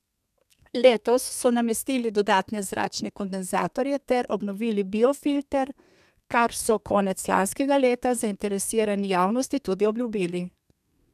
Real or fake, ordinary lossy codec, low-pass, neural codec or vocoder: fake; none; 14.4 kHz; codec, 32 kHz, 1.9 kbps, SNAC